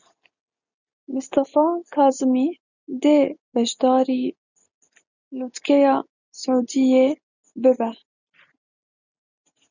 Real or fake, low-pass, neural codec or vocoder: real; 7.2 kHz; none